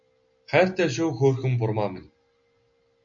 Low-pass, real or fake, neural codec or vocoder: 7.2 kHz; real; none